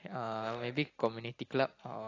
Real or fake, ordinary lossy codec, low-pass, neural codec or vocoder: real; AAC, 32 kbps; 7.2 kHz; none